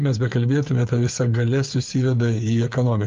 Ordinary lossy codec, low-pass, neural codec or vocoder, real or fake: Opus, 24 kbps; 7.2 kHz; codec, 16 kHz, 8 kbps, FreqCodec, smaller model; fake